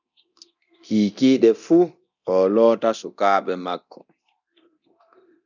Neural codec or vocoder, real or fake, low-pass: codec, 24 kHz, 0.9 kbps, DualCodec; fake; 7.2 kHz